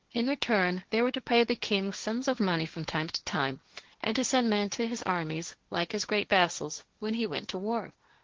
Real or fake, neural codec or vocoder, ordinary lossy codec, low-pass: fake; codec, 16 kHz, 1.1 kbps, Voila-Tokenizer; Opus, 16 kbps; 7.2 kHz